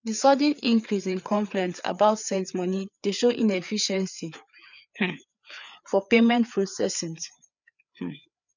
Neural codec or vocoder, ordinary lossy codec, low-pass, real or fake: codec, 16 kHz, 4 kbps, FreqCodec, larger model; none; 7.2 kHz; fake